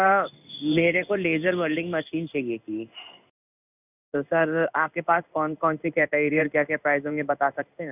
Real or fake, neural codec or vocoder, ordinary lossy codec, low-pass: fake; codec, 16 kHz in and 24 kHz out, 1 kbps, XY-Tokenizer; none; 3.6 kHz